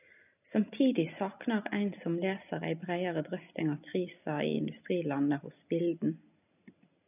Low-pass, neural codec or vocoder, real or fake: 3.6 kHz; none; real